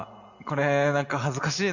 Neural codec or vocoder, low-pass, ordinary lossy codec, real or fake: none; 7.2 kHz; none; real